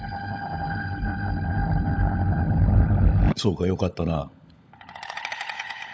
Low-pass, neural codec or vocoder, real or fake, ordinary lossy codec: none; codec, 16 kHz, 16 kbps, FunCodec, trained on LibriTTS, 50 frames a second; fake; none